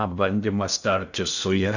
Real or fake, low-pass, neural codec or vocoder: fake; 7.2 kHz; codec, 16 kHz in and 24 kHz out, 0.6 kbps, FocalCodec, streaming, 2048 codes